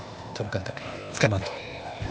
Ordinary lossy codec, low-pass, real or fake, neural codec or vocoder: none; none; fake; codec, 16 kHz, 0.8 kbps, ZipCodec